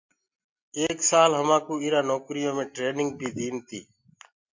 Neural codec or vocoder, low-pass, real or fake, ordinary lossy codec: none; 7.2 kHz; real; MP3, 48 kbps